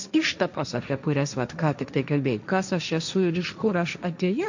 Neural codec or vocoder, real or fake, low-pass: codec, 16 kHz, 1.1 kbps, Voila-Tokenizer; fake; 7.2 kHz